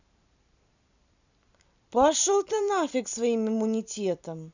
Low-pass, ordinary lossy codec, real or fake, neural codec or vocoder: 7.2 kHz; none; fake; vocoder, 44.1 kHz, 128 mel bands every 256 samples, BigVGAN v2